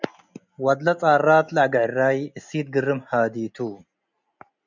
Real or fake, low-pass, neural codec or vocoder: real; 7.2 kHz; none